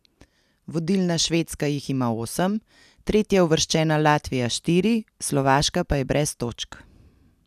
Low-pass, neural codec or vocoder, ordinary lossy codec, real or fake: 14.4 kHz; none; none; real